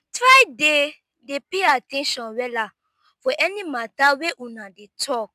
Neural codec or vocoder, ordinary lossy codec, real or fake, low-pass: none; none; real; 14.4 kHz